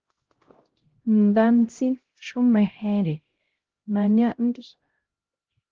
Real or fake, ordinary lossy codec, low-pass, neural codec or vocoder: fake; Opus, 16 kbps; 7.2 kHz; codec, 16 kHz, 0.5 kbps, X-Codec, HuBERT features, trained on LibriSpeech